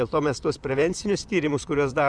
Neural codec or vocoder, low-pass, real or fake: vocoder, 44.1 kHz, 128 mel bands, Pupu-Vocoder; 9.9 kHz; fake